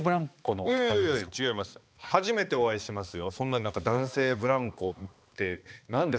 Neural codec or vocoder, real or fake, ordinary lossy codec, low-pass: codec, 16 kHz, 2 kbps, X-Codec, HuBERT features, trained on balanced general audio; fake; none; none